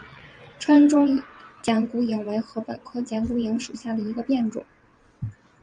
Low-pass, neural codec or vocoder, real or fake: 9.9 kHz; vocoder, 22.05 kHz, 80 mel bands, WaveNeXt; fake